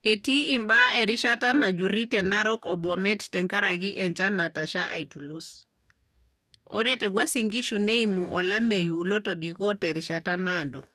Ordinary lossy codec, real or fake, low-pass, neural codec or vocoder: none; fake; 14.4 kHz; codec, 44.1 kHz, 2.6 kbps, DAC